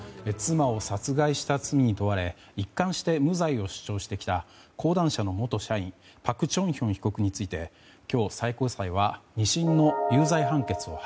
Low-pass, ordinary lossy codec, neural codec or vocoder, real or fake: none; none; none; real